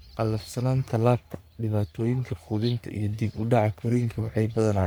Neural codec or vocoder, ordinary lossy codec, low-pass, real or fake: codec, 44.1 kHz, 3.4 kbps, Pupu-Codec; none; none; fake